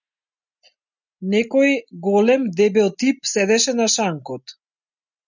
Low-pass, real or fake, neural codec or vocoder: 7.2 kHz; real; none